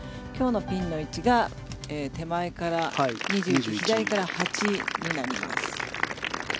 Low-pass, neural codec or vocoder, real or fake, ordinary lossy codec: none; none; real; none